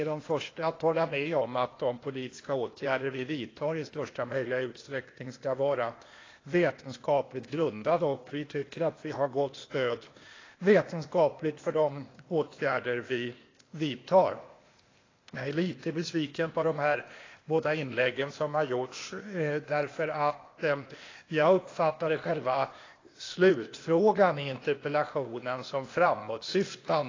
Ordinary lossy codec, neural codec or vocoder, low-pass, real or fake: AAC, 32 kbps; codec, 16 kHz, 0.8 kbps, ZipCodec; 7.2 kHz; fake